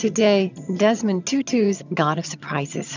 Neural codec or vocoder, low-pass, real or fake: vocoder, 22.05 kHz, 80 mel bands, HiFi-GAN; 7.2 kHz; fake